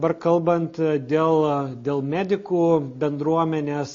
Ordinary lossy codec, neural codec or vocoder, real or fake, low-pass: MP3, 32 kbps; none; real; 7.2 kHz